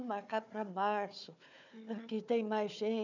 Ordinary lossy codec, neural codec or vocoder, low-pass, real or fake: none; codec, 16 kHz, 4 kbps, FunCodec, trained on Chinese and English, 50 frames a second; 7.2 kHz; fake